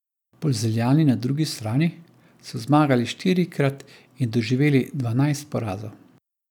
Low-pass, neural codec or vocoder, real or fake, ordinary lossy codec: 19.8 kHz; none; real; none